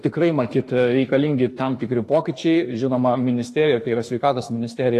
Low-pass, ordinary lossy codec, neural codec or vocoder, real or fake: 14.4 kHz; AAC, 48 kbps; autoencoder, 48 kHz, 32 numbers a frame, DAC-VAE, trained on Japanese speech; fake